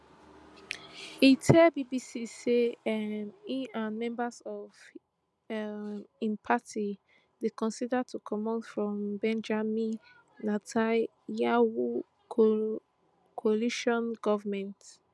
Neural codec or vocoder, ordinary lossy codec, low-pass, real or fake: none; none; none; real